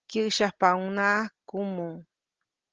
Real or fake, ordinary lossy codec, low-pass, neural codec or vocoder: real; Opus, 16 kbps; 7.2 kHz; none